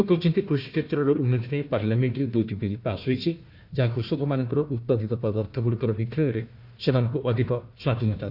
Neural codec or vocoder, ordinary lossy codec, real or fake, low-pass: codec, 16 kHz, 1 kbps, FunCodec, trained on Chinese and English, 50 frames a second; none; fake; 5.4 kHz